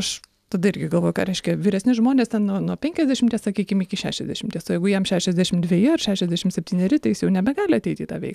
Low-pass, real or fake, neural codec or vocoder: 14.4 kHz; real; none